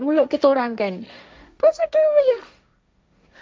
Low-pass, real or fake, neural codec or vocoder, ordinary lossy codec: none; fake; codec, 16 kHz, 1.1 kbps, Voila-Tokenizer; none